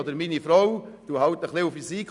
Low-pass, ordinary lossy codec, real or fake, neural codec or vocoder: 10.8 kHz; none; real; none